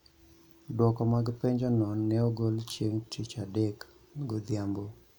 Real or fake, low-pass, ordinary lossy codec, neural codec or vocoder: real; 19.8 kHz; none; none